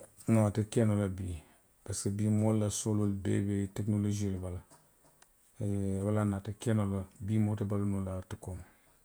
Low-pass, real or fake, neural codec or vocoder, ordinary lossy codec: none; fake; vocoder, 48 kHz, 128 mel bands, Vocos; none